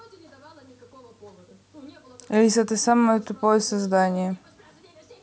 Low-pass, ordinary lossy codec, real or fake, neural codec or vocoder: none; none; real; none